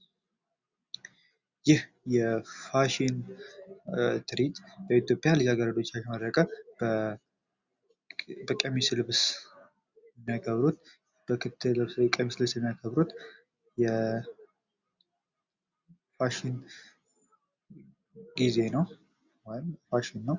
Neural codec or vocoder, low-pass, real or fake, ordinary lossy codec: none; 7.2 kHz; real; Opus, 64 kbps